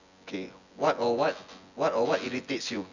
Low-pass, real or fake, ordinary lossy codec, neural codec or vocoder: 7.2 kHz; fake; none; vocoder, 24 kHz, 100 mel bands, Vocos